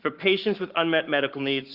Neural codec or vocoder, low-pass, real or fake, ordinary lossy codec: codec, 44.1 kHz, 7.8 kbps, Pupu-Codec; 5.4 kHz; fake; Opus, 32 kbps